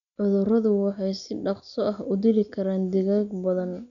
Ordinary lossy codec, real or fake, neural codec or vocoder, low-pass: none; real; none; 7.2 kHz